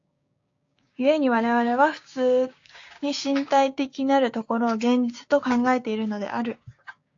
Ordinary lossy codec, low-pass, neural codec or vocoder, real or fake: AAC, 48 kbps; 7.2 kHz; codec, 16 kHz, 6 kbps, DAC; fake